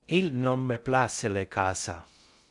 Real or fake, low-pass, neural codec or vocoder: fake; 10.8 kHz; codec, 16 kHz in and 24 kHz out, 0.6 kbps, FocalCodec, streaming, 2048 codes